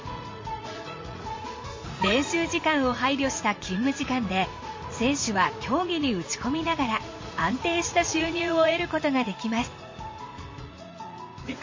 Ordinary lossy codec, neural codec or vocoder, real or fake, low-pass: MP3, 32 kbps; vocoder, 44.1 kHz, 80 mel bands, Vocos; fake; 7.2 kHz